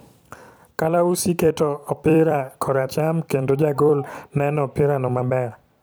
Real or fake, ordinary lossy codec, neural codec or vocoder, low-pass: fake; none; vocoder, 44.1 kHz, 128 mel bands every 256 samples, BigVGAN v2; none